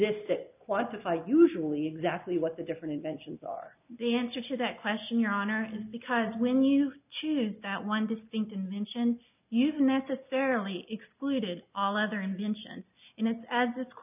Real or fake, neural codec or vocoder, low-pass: real; none; 3.6 kHz